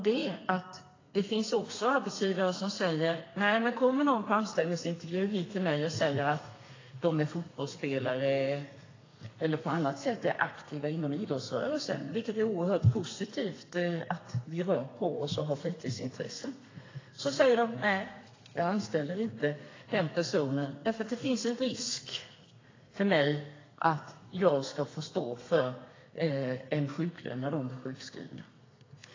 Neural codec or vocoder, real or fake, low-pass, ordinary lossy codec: codec, 32 kHz, 1.9 kbps, SNAC; fake; 7.2 kHz; AAC, 32 kbps